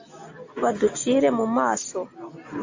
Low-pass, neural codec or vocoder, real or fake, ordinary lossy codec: 7.2 kHz; none; real; AAC, 48 kbps